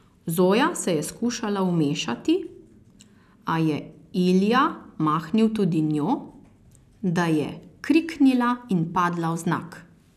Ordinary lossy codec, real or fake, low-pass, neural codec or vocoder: none; real; 14.4 kHz; none